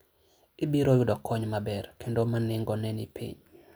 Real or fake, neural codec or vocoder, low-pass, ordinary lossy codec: real; none; none; none